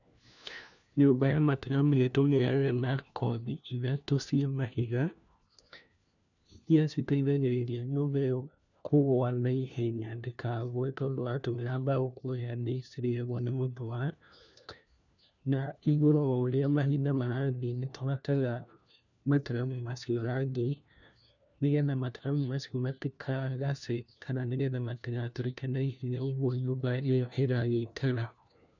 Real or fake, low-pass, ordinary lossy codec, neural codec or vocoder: fake; 7.2 kHz; none; codec, 16 kHz, 1 kbps, FunCodec, trained on LibriTTS, 50 frames a second